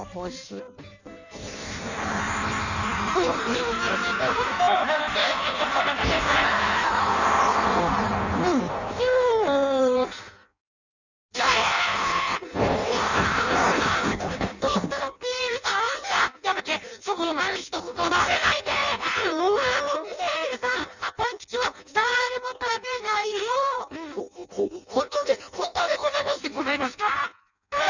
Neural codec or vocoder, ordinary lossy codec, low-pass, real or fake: codec, 16 kHz in and 24 kHz out, 0.6 kbps, FireRedTTS-2 codec; none; 7.2 kHz; fake